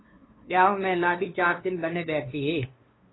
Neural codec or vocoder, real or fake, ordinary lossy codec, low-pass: codec, 16 kHz, 2 kbps, FunCodec, trained on LibriTTS, 25 frames a second; fake; AAC, 16 kbps; 7.2 kHz